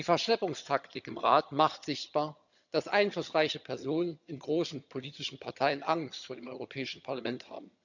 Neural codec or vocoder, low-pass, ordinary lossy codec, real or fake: vocoder, 22.05 kHz, 80 mel bands, HiFi-GAN; 7.2 kHz; none; fake